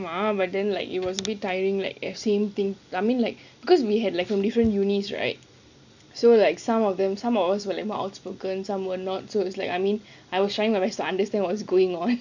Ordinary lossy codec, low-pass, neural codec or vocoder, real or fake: none; 7.2 kHz; none; real